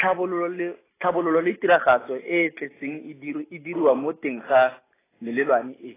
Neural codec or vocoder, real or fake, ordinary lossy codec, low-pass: none; real; AAC, 16 kbps; 3.6 kHz